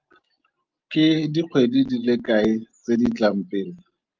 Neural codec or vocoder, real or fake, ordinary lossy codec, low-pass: none; real; Opus, 32 kbps; 7.2 kHz